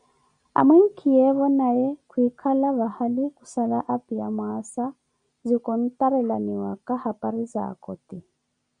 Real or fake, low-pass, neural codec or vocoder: real; 9.9 kHz; none